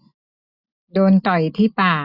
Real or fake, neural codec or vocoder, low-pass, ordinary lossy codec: real; none; 5.4 kHz; none